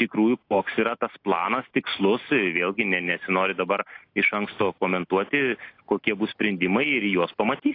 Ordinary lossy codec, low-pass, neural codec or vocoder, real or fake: AAC, 32 kbps; 5.4 kHz; none; real